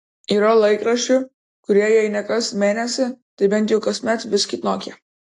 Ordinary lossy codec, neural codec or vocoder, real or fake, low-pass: AAC, 48 kbps; none; real; 10.8 kHz